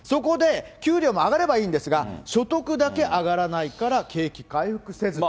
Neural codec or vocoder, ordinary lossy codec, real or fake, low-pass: none; none; real; none